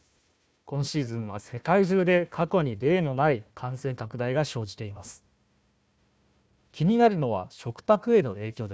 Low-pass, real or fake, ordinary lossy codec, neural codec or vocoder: none; fake; none; codec, 16 kHz, 1 kbps, FunCodec, trained on Chinese and English, 50 frames a second